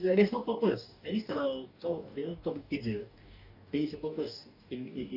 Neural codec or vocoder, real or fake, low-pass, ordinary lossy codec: codec, 44.1 kHz, 2.6 kbps, DAC; fake; 5.4 kHz; none